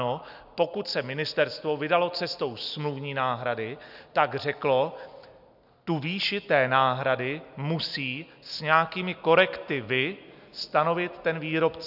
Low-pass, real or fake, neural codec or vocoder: 5.4 kHz; real; none